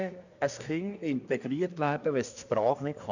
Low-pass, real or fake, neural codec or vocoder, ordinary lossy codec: 7.2 kHz; fake; codec, 24 kHz, 1 kbps, SNAC; none